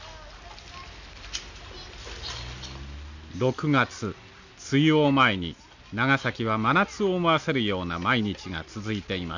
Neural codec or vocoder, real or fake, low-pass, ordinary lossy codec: none; real; 7.2 kHz; none